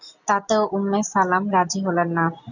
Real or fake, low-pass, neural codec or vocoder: real; 7.2 kHz; none